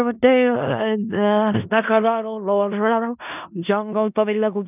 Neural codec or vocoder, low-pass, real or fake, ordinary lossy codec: codec, 16 kHz in and 24 kHz out, 0.4 kbps, LongCat-Audio-Codec, four codebook decoder; 3.6 kHz; fake; none